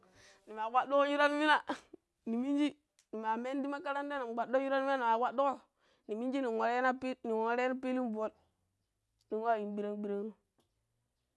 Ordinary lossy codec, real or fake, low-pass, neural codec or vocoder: none; real; none; none